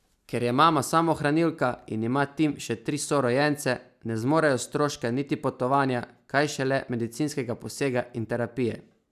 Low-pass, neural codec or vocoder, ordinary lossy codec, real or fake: 14.4 kHz; none; none; real